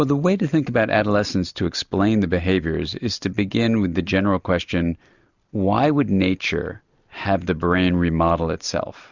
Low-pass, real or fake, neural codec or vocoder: 7.2 kHz; real; none